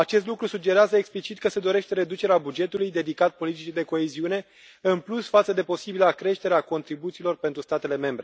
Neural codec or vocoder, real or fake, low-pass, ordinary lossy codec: none; real; none; none